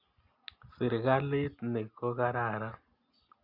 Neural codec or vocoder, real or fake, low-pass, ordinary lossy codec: none; real; 5.4 kHz; Opus, 64 kbps